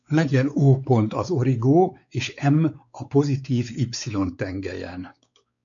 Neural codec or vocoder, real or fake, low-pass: codec, 16 kHz, 4 kbps, X-Codec, WavLM features, trained on Multilingual LibriSpeech; fake; 7.2 kHz